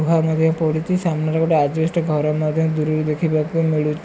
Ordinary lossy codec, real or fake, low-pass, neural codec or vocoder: none; real; none; none